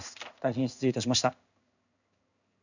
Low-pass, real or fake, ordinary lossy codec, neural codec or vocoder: 7.2 kHz; fake; none; codec, 16 kHz in and 24 kHz out, 1 kbps, XY-Tokenizer